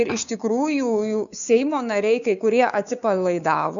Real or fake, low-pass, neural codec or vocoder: fake; 7.2 kHz; codec, 16 kHz, 6 kbps, DAC